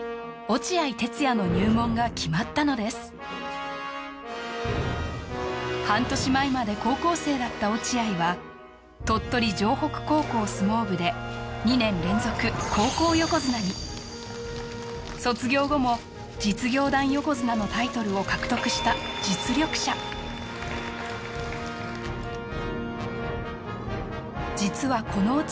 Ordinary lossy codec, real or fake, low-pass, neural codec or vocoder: none; real; none; none